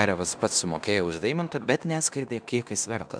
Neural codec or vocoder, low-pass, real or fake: codec, 16 kHz in and 24 kHz out, 0.9 kbps, LongCat-Audio-Codec, fine tuned four codebook decoder; 9.9 kHz; fake